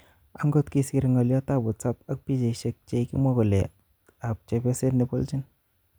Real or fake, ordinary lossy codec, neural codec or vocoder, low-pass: real; none; none; none